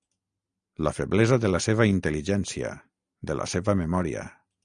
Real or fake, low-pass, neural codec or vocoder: real; 9.9 kHz; none